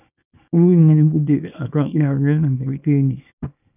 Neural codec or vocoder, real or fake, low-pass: codec, 24 kHz, 0.9 kbps, WavTokenizer, small release; fake; 3.6 kHz